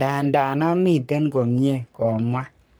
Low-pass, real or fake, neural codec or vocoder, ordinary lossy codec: none; fake; codec, 44.1 kHz, 3.4 kbps, Pupu-Codec; none